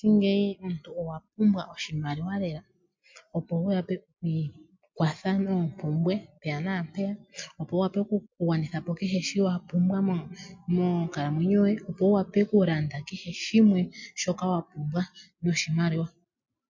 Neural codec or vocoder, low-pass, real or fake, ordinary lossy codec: none; 7.2 kHz; real; MP3, 48 kbps